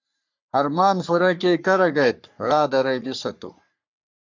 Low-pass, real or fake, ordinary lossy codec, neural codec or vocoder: 7.2 kHz; fake; MP3, 64 kbps; codec, 44.1 kHz, 3.4 kbps, Pupu-Codec